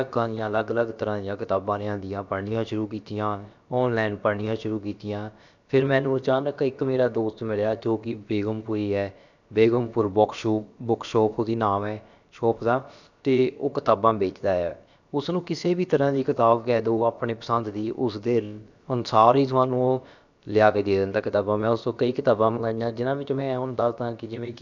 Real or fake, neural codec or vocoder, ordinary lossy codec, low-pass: fake; codec, 16 kHz, about 1 kbps, DyCAST, with the encoder's durations; none; 7.2 kHz